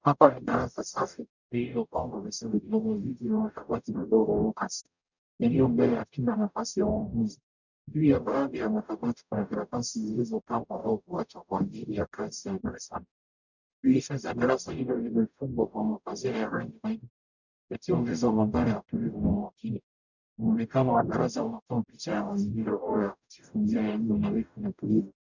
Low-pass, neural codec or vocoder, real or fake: 7.2 kHz; codec, 44.1 kHz, 0.9 kbps, DAC; fake